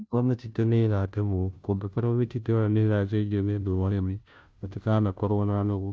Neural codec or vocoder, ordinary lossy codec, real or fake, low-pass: codec, 16 kHz, 0.5 kbps, FunCodec, trained on Chinese and English, 25 frames a second; none; fake; none